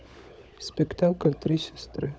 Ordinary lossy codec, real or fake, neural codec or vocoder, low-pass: none; fake; codec, 16 kHz, 16 kbps, FunCodec, trained on LibriTTS, 50 frames a second; none